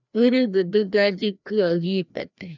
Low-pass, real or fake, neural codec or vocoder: 7.2 kHz; fake; codec, 16 kHz, 1 kbps, FreqCodec, larger model